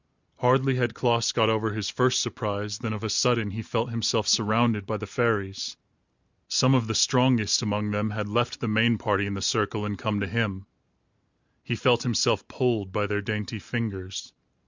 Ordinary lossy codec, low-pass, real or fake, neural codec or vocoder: Opus, 64 kbps; 7.2 kHz; real; none